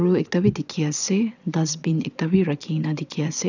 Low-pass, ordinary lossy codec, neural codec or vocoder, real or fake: 7.2 kHz; none; vocoder, 22.05 kHz, 80 mel bands, WaveNeXt; fake